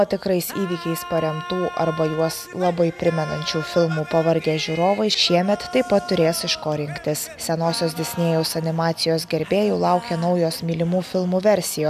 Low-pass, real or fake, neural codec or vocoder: 14.4 kHz; real; none